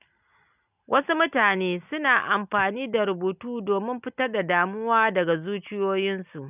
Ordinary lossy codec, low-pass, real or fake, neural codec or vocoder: none; 3.6 kHz; real; none